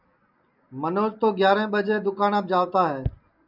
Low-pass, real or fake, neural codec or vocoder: 5.4 kHz; real; none